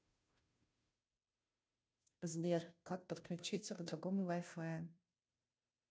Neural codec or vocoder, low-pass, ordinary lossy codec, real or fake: codec, 16 kHz, 0.5 kbps, FunCodec, trained on Chinese and English, 25 frames a second; none; none; fake